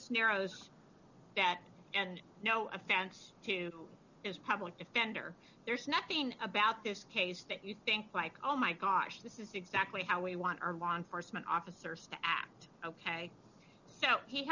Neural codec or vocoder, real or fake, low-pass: none; real; 7.2 kHz